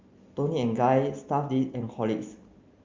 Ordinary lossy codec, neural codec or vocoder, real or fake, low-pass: Opus, 32 kbps; none; real; 7.2 kHz